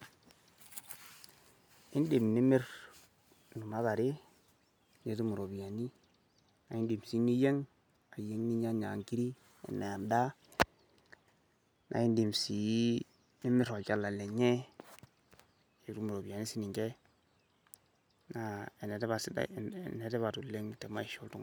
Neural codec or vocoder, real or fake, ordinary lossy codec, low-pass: none; real; none; none